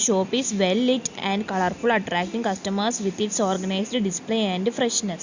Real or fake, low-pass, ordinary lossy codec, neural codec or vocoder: real; 7.2 kHz; Opus, 64 kbps; none